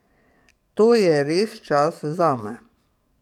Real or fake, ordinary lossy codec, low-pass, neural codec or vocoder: fake; none; 19.8 kHz; codec, 44.1 kHz, 7.8 kbps, DAC